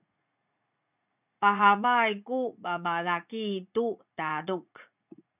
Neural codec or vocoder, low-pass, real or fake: vocoder, 44.1 kHz, 80 mel bands, Vocos; 3.6 kHz; fake